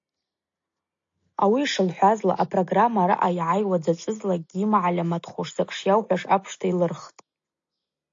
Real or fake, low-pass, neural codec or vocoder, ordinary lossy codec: real; 7.2 kHz; none; AAC, 48 kbps